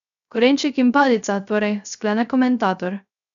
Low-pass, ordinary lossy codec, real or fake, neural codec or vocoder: 7.2 kHz; none; fake; codec, 16 kHz, 0.3 kbps, FocalCodec